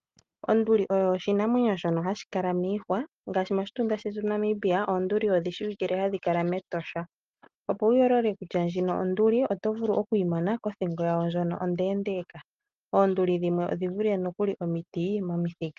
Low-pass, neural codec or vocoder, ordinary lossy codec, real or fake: 7.2 kHz; none; Opus, 32 kbps; real